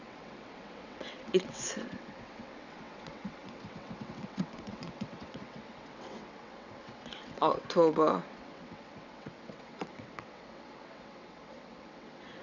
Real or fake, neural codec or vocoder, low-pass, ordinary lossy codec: fake; vocoder, 22.05 kHz, 80 mel bands, WaveNeXt; 7.2 kHz; none